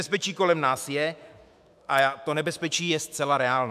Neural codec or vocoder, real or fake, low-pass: autoencoder, 48 kHz, 128 numbers a frame, DAC-VAE, trained on Japanese speech; fake; 14.4 kHz